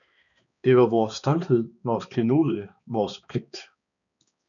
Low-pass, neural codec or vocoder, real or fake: 7.2 kHz; codec, 16 kHz, 2 kbps, X-Codec, HuBERT features, trained on balanced general audio; fake